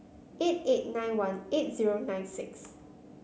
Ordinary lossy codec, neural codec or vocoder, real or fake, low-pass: none; none; real; none